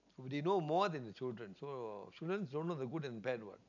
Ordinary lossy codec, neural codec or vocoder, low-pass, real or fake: none; none; 7.2 kHz; real